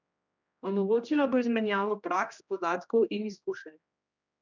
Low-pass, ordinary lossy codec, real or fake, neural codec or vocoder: 7.2 kHz; none; fake; codec, 16 kHz, 1 kbps, X-Codec, HuBERT features, trained on general audio